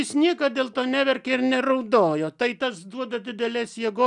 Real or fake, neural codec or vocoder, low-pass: real; none; 10.8 kHz